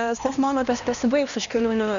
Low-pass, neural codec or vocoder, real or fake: 7.2 kHz; codec, 16 kHz, 1 kbps, X-Codec, HuBERT features, trained on LibriSpeech; fake